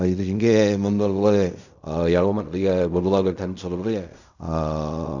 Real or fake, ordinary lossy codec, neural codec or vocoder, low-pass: fake; none; codec, 16 kHz in and 24 kHz out, 0.4 kbps, LongCat-Audio-Codec, fine tuned four codebook decoder; 7.2 kHz